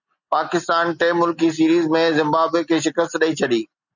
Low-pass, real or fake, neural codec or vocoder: 7.2 kHz; real; none